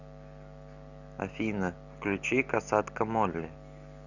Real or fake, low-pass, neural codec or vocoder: real; 7.2 kHz; none